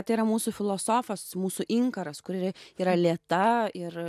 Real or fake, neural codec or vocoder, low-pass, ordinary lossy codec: real; none; 14.4 kHz; AAC, 96 kbps